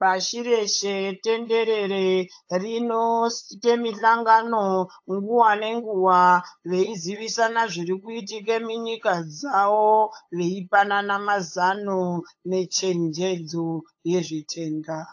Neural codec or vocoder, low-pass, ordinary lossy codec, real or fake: codec, 16 kHz, 8 kbps, FunCodec, trained on LibriTTS, 25 frames a second; 7.2 kHz; AAC, 48 kbps; fake